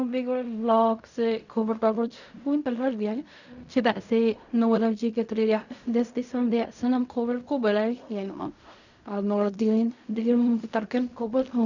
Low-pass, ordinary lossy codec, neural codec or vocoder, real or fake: 7.2 kHz; none; codec, 16 kHz in and 24 kHz out, 0.4 kbps, LongCat-Audio-Codec, fine tuned four codebook decoder; fake